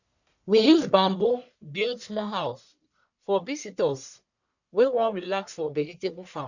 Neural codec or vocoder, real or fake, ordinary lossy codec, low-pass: codec, 44.1 kHz, 1.7 kbps, Pupu-Codec; fake; none; 7.2 kHz